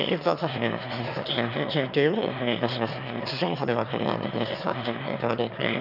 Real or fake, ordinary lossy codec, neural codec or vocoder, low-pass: fake; none; autoencoder, 22.05 kHz, a latent of 192 numbers a frame, VITS, trained on one speaker; 5.4 kHz